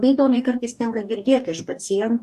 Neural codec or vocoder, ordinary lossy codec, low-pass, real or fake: codec, 44.1 kHz, 2.6 kbps, DAC; AAC, 96 kbps; 14.4 kHz; fake